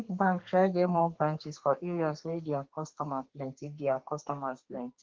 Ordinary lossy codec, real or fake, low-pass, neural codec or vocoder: Opus, 16 kbps; fake; 7.2 kHz; codec, 32 kHz, 1.9 kbps, SNAC